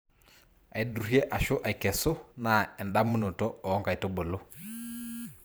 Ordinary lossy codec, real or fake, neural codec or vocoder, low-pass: none; fake; vocoder, 44.1 kHz, 128 mel bands every 512 samples, BigVGAN v2; none